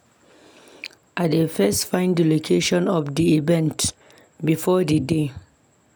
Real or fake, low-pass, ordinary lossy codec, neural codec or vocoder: real; none; none; none